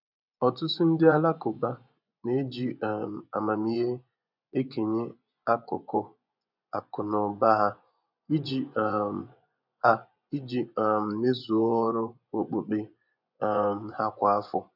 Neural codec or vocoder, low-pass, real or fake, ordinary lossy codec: vocoder, 24 kHz, 100 mel bands, Vocos; 5.4 kHz; fake; none